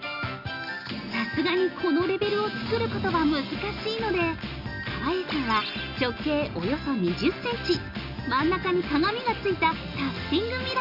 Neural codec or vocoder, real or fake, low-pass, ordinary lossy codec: none; real; 5.4 kHz; Opus, 64 kbps